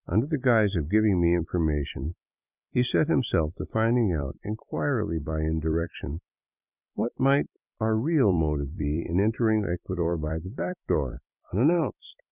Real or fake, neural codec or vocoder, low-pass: fake; vocoder, 44.1 kHz, 128 mel bands every 256 samples, BigVGAN v2; 3.6 kHz